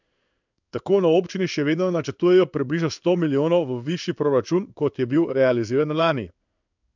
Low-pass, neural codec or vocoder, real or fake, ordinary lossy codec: 7.2 kHz; codec, 16 kHz in and 24 kHz out, 1 kbps, XY-Tokenizer; fake; none